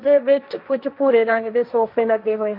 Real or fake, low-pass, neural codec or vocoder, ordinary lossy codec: fake; 5.4 kHz; codec, 16 kHz, 1.1 kbps, Voila-Tokenizer; none